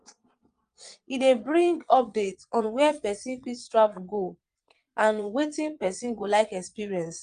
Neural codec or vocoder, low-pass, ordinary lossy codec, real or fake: vocoder, 22.05 kHz, 80 mel bands, Vocos; 9.9 kHz; Opus, 32 kbps; fake